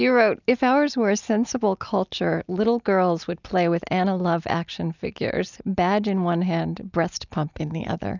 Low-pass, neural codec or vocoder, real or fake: 7.2 kHz; none; real